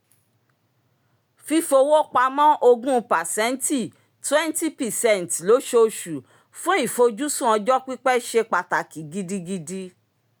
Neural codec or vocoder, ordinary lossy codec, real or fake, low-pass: none; none; real; none